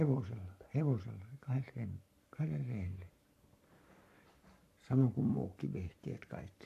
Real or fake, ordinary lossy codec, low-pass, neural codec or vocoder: fake; AAC, 64 kbps; 14.4 kHz; codec, 44.1 kHz, 7.8 kbps, Pupu-Codec